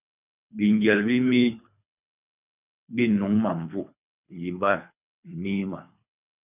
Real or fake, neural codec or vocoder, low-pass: fake; codec, 24 kHz, 3 kbps, HILCodec; 3.6 kHz